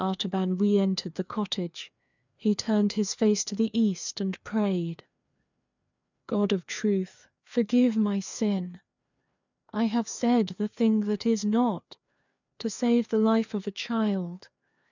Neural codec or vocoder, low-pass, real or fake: codec, 16 kHz, 2 kbps, FreqCodec, larger model; 7.2 kHz; fake